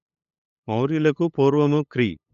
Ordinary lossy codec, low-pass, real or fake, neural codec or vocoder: none; 7.2 kHz; fake; codec, 16 kHz, 8 kbps, FunCodec, trained on LibriTTS, 25 frames a second